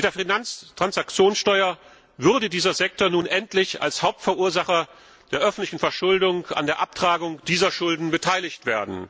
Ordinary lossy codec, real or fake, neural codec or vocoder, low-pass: none; real; none; none